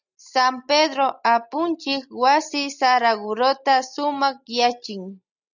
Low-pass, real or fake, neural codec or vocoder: 7.2 kHz; real; none